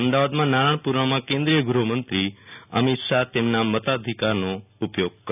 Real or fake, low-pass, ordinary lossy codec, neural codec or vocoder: real; 3.6 kHz; none; none